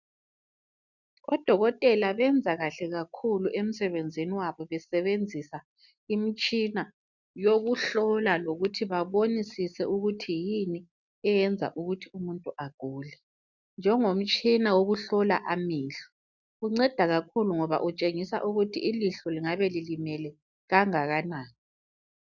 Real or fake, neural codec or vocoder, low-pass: real; none; 7.2 kHz